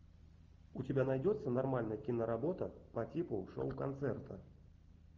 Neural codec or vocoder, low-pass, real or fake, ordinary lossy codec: none; 7.2 kHz; real; Opus, 32 kbps